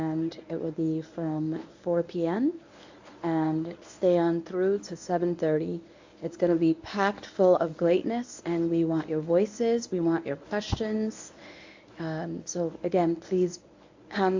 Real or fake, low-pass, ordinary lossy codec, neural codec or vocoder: fake; 7.2 kHz; AAC, 48 kbps; codec, 24 kHz, 0.9 kbps, WavTokenizer, medium speech release version 1